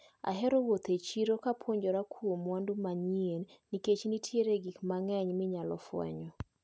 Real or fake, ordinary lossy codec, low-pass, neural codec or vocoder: real; none; none; none